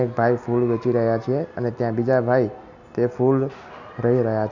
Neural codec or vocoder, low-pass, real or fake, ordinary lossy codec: none; 7.2 kHz; real; none